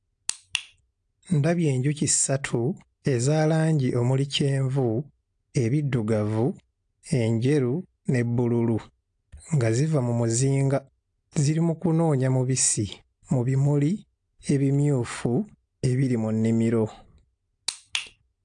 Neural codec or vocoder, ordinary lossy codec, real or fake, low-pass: none; AAC, 64 kbps; real; 9.9 kHz